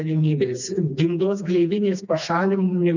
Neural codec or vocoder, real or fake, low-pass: codec, 16 kHz, 2 kbps, FreqCodec, smaller model; fake; 7.2 kHz